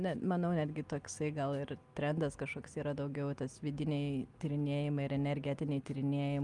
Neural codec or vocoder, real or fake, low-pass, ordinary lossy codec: none; real; 10.8 kHz; Opus, 32 kbps